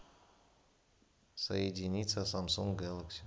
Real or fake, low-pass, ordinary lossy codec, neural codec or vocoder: real; none; none; none